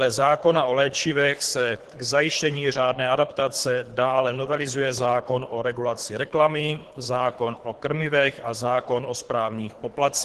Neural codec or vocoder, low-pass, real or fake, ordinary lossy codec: codec, 24 kHz, 3 kbps, HILCodec; 10.8 kHz; fake; Opus, 16 kbps